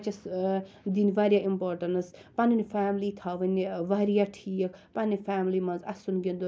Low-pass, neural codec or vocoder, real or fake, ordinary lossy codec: none; none; real; none